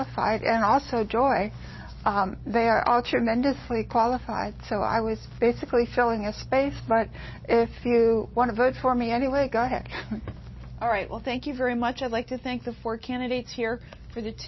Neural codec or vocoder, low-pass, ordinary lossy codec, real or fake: none; 7.2 kHz; MP3, 24 kbps; real